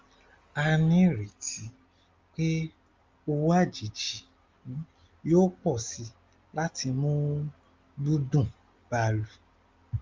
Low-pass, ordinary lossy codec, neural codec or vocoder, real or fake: 7.2 kHz; Opus, 32 kbps; none; real